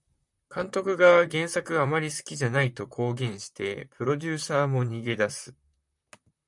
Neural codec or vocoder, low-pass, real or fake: vocoder, 44.1 kHz, 128 mel bands, Pupu-Vocoder; 10.8 kHz; fake